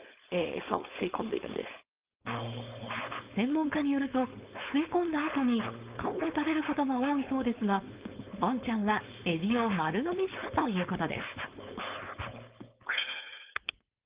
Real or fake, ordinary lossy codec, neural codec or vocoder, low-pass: fake; Opus, 32 kbps; codec, 16 kHz, 4.8 kbps, FACodec; 3.6 kHz